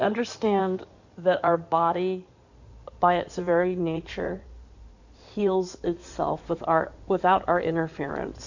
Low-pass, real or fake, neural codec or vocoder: 7.2 kHz; fake; codec, 16 kHz in and 24 kHz out, 2.2 kbps, FireRedTTS-2 codec